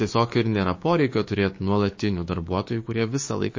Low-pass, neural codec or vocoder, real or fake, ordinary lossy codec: 7.2 kHz; codec, 16 kHz, 6 kbps, DAC; fake; MP3, 32 kbps